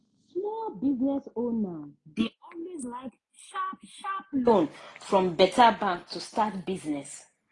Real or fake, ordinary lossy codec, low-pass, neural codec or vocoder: real; AAC, 32 kbps; 10.8 kHz; none